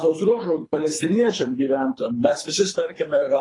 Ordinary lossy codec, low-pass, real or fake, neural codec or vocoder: AAC, 32 kbps; 10.8 kHz; fake; codec, 24 kHz, 3 kbps, HILCodec